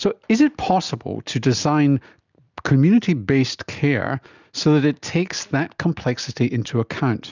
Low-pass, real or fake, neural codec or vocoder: 7.2 kHz; real; none